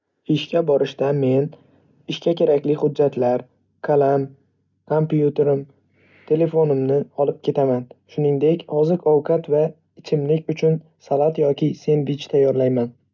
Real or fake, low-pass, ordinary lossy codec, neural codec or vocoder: real; 7.2 kHz; none; none